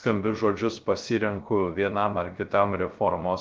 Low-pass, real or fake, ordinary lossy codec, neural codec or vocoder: 7.2 kHz; fake; Opus, 32 kbps; codec, 16 kHz, 0.3 kbps, FocalCodec